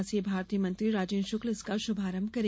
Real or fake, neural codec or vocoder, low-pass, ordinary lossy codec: real; none; none; none